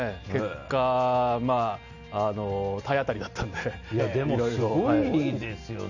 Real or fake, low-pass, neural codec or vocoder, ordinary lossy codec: real; 7.2 kHz; none; none